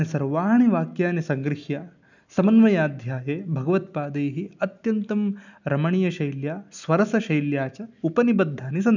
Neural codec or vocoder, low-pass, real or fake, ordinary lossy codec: none; 7.2 kHz; real; none